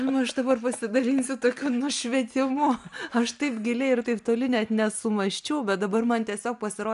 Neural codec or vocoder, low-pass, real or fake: none; 10.8 kHz; real